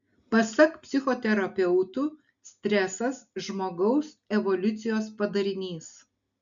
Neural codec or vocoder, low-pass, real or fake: none; 7.2 kHz; real